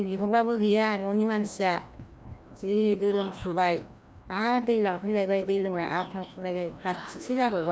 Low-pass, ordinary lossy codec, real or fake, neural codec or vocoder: none; none; fake; codec, 16 kHz, 1 kbps, FreqCodec, larger model